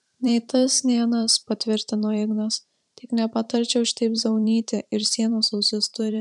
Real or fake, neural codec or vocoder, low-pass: fake; vocoder, 44.1 kHz, 128 mel bands every 512 samples, BigVGAN v2; 10.8 kHz